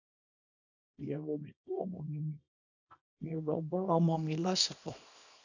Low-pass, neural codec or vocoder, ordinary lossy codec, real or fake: 7.2 kHz; codec, 24 kHz, 0.9 kbps, WavTokenizer, small release; AAC, 48 kbps; fake